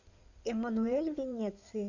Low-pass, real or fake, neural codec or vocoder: 7.2 kHz; fake; codec, 16 kHz in and 24 kHz out, 2.2 kbps, FireRedTTS-2 codec